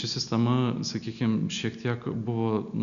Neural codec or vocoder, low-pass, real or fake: none; 7.2 kHz; real